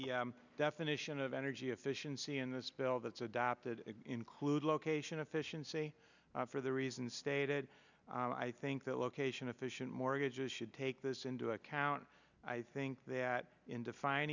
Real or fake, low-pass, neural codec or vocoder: real; 7.2 kHz; none